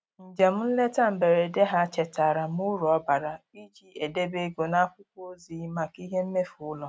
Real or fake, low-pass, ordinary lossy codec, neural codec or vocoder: real; none; none; none